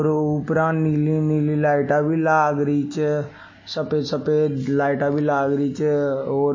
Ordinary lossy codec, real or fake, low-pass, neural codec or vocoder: MP3, 32 kbps; real; 7.2 kHz; none